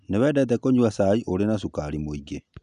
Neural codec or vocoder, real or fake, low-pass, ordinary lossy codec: none; real; 10.8 kHz; none